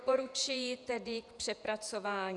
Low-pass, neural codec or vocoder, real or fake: 10.8 kHz; vocoder, 48 kHz, 128 mel bands, Vocos; fake